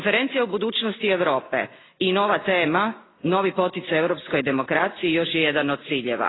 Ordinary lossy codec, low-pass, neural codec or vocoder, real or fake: AAC, 16 kbps; 7.2 kHz; none; real